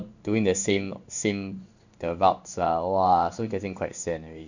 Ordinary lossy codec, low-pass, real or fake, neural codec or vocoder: none; 7.2 kHz; fake; codec, 16 kHz in and 24 kHz out, 1 kbps, XY-Tokenizer